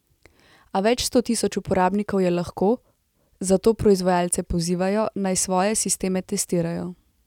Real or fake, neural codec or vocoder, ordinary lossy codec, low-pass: real; none; none; 19.8 kHz